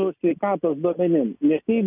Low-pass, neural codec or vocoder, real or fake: 3.6 kHz; none; real